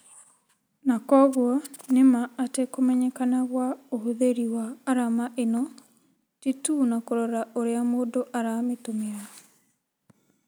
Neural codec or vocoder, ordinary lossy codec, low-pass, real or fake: none; none; none; real